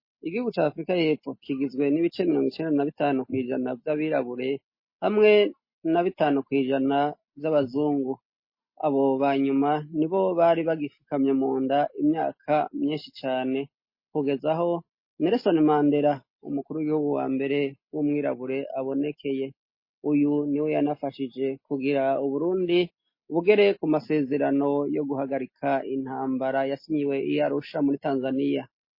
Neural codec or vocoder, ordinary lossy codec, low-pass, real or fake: none; MP3, 24 kbps; 5.4 kHz; real